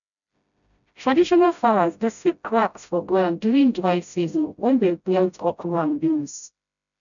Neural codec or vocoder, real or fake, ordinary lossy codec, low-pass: codec, 16 kHz, 0.5 kbps, FreqCodec, smaller model; fake; none; 7.2 kHz